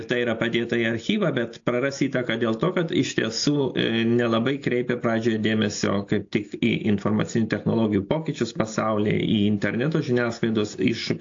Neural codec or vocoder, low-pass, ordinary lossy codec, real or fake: none; 7.2 kHz; AAC, 48 kbps; real